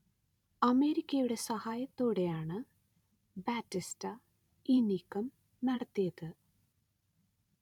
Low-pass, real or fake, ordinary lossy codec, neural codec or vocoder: 19.8 kHz; fake; none; vocoder, 44.1 kHz, 128 mel bands every 512 samples, BigVGAN v2